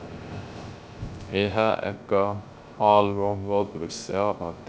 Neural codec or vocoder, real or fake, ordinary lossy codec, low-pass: codec, 16 kHz, 0.3 kbps, FocalCodec; fake; none; none